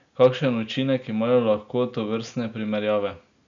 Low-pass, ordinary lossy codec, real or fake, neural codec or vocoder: 7.2 kHz; none; real; none